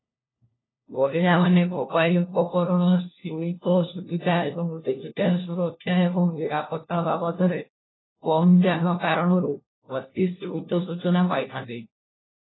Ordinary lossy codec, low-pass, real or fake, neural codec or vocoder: AAC, 16 kbps; 7.2 kHz; fake; codec, 16 kHz, 1 kbps, FunCodec, trained on LibriTTS, 50 frames a second